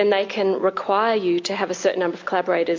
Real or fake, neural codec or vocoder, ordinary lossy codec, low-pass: real; none; MP3, 48 kbps; 7.2 kHz